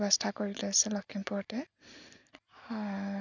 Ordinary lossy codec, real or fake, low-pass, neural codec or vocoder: none; real; 7.2 kHz; none